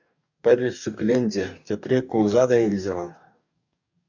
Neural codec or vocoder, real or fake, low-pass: codec, 44.1 kHz, 2.6 kbps, DAC; fake; 7.2 kHz